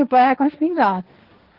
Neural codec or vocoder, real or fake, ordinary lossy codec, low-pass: codec, 16 kHz, 1.1 kbps, Voila-Tokenizer; fake; Opus, 16 kbps; 5.4 kHz